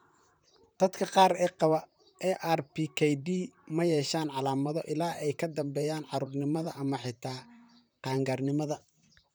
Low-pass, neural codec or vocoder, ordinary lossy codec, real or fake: none; vocoder, 44.1 kHz, 128 mel bands, Pupu-Vocoder; none; fake